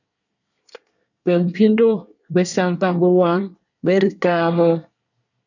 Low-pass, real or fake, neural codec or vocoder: 7.2 kHz; fake; codec, 24 kHz, 1 kbps, SNAC